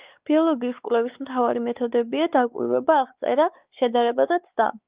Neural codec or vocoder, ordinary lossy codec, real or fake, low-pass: codec, 16 kHz, 4 kbps, X-Codec, WavLM features, trained on Multilingual LibriSpeech; Opus, 24 kbps; fake; 3.6 kHz